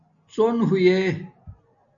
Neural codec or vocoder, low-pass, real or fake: none; 7.2 kHz; real